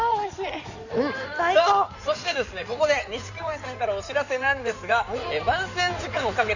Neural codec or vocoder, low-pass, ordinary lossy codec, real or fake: codec, 16 kHz in and 24 kHz out, 2.2 kbps, FireRedTTS-2 codec; 7.2 kHz; none; fake